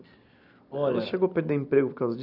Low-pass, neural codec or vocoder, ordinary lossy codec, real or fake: 5.4 kHz; none; none; real